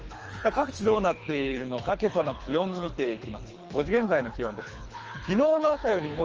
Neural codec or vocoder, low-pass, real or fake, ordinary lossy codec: codec, 24 kHz, 3 kbps, HILCodec; 7.2 kHz; fake; Opus, 24 kbps